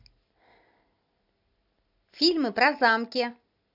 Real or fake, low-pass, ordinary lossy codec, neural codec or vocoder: real; 5.4 kHz; AAC, 48 kbps; none